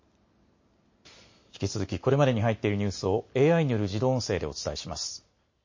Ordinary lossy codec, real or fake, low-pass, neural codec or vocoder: MP3, 32 kbps; real; 7.2 kHz; none